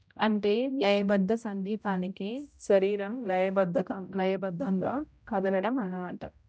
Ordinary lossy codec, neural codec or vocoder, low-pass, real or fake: none; codec, 16 kHz, 0.5 kbps, X-Codec, HuBERT features, trained on general audio; none; fake